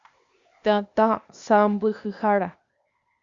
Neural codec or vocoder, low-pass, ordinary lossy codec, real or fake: codec, 16 kHz, 0.8 kbps, ZipCodec; 7.2 kHz; Opus, 64 kbps; fake